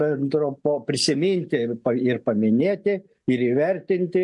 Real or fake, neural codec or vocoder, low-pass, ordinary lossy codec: real; none; 10.8 kHz; MP3, 64 kbps